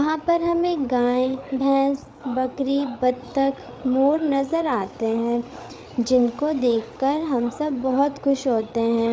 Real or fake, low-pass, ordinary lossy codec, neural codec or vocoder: fake; none; none; codec, 16 kHz, 8 kbps, FreqCodec, larger model